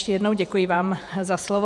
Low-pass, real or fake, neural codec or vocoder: 10.8 kHz; fake; vocoder, 48 kHz, 128 mel bands, Vocos